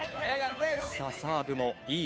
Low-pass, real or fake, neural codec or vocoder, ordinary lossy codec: none; fake; codec, 16 kHz, 2 kbps, FunCodec, trained on Chinese and English, 25 frames a second; none